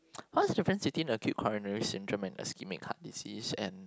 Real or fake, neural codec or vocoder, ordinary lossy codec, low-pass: real; none; none; none